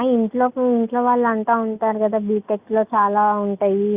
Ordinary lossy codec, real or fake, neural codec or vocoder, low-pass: Opus, 64 kbps; real; none; 3.6 kHz